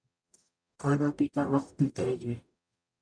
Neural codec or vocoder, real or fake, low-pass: codec, 44.1 kHz, 0.9 kbps, DAC; fake; 9.9 kHz